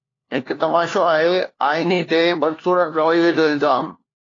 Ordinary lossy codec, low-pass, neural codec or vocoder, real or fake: AAC, 32 kbps; 7.2 kHz; codec, 16 kHz, 1 kbps, FunCodec, trained on LibriTTS, 50 frames a second; fake